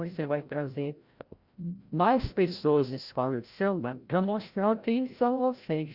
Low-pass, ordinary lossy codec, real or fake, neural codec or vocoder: 5.4 kHz; Opus, 64 kbps; fake; codec, 16 kHz, 0.5 kbps, FreqCodec, larger model